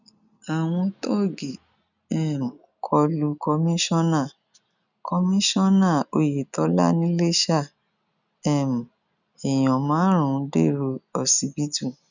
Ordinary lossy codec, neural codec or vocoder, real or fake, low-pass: none; none; real; 7.2 kHz